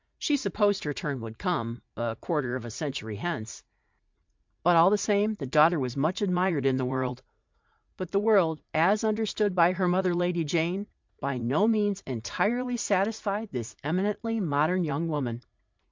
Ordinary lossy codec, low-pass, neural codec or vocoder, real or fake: MP3, 64 kbps; 7.2 kHz; vocoder, 44.1 kHz, 80 mel bands, Vocos; fake